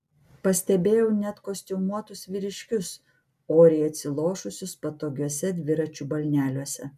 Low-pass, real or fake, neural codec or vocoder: 14.4 kHz; real; none